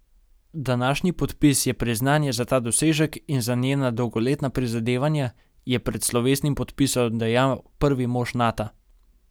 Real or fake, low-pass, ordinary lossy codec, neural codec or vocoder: real; none; none; none